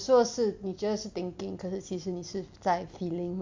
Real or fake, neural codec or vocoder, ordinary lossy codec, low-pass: real; none; MP3, 48 kbps; 7.2 kHz